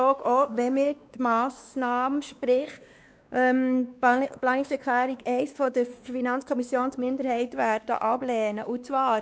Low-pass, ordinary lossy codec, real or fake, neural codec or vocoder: none; none; fake; codec, 16 kHz, 2 kbps, X-Codec, WavLM features, trained on Multilingual LibriSpeech